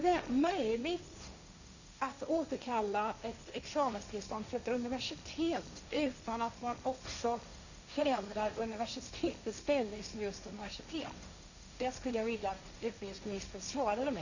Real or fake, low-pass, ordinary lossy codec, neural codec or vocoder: fake; 7.2 kHz; none; codec, 16 kHz, 1.1 kbps, Voila-Tokenizer